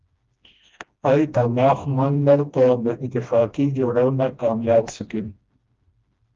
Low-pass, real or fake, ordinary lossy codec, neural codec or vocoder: 7.2 kHz; fake; Opus, 32 kbps; codec, 16 kHz, 1 kbps, FreqCodec, smaller model